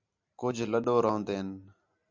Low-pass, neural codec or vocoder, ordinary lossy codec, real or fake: 7.2 kHz; none; MP3, 64 kbps; real